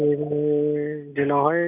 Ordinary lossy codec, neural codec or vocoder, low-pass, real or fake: none; none; 3.6 kHz; real